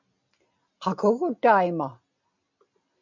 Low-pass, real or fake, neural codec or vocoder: 7.2 kHz; real; none